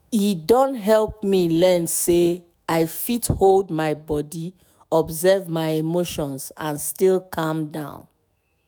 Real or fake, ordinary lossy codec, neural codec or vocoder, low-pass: fake; none; autoencoder, 48 kHz, 128 numbers a frame, DAC-VAE, trained on Japanese speech; none